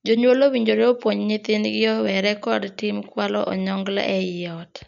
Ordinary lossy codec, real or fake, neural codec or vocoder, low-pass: none; real; none; 7.2 kHz